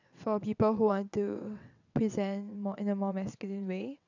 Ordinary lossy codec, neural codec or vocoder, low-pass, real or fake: none; vocoder, 22.05 kHz, 80 mel bands, WaveNeXt; 7.2 kHz; fake